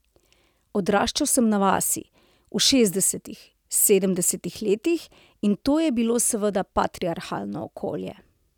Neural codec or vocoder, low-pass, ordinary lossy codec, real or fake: none; 19.8 kHz; none; real